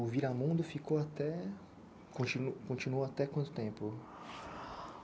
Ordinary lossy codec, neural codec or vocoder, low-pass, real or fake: none; none; none; real